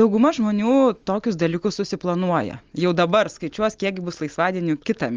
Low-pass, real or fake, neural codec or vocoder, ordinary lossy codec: 7.2 kHz; real; none; Opus, 32 kbps